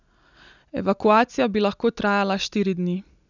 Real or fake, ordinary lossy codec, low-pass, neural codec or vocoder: real; none; 7.2 kHz; none